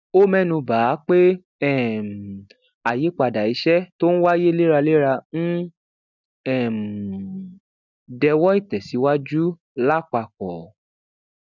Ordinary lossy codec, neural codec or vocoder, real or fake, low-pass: none; none; real; 7.2 kHz